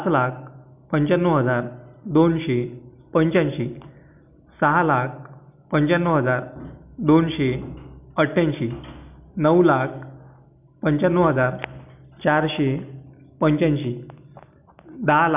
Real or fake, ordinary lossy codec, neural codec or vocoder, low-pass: real; none; none; 3.6 kHz